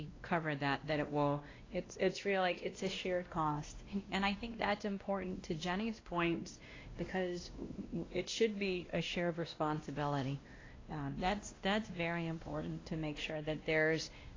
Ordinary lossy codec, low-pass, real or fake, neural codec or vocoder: AAC, 32 kbps; 7.2 kHz; fake; codec, 16 kHz, 1 kbps, X-Codec, WavLM features, trained on Multilingual LibriSpeech